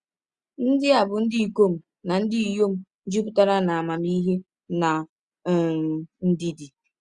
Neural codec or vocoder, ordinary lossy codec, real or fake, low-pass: none; Opus, 64 kbps; real; 10.8 kHz